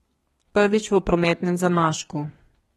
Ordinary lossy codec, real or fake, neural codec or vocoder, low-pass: AAC, 32 kbps; fake; codec, 32 kHz, 1.9 kbps, SNAC; 14.4 kHz